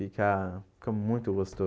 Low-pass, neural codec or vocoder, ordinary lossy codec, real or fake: none; none; none; real